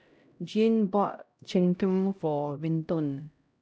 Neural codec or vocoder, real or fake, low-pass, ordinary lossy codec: codec, 16 kHz, 0.5 kbps, X-Codec, HuBERT features, trained on LibriSpeech; fake; none; none